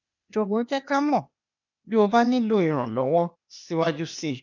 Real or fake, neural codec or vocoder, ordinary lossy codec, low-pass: fake; codec, 16 kHz, 0.8 kbps, ZipCodec; none; 7.2 kHz